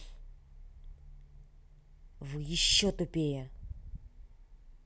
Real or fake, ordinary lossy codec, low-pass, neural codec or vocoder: real; none; none; none